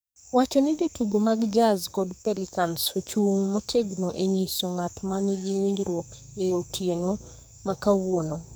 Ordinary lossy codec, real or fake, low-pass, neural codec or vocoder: none; fake; none; codec, 44.1 kHz, 2.6 kbps, SNAC